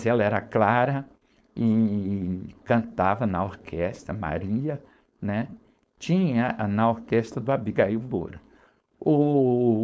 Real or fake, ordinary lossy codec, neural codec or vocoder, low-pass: fake; none; codec, 16 kHz, 4.8 kbps, FACodec; none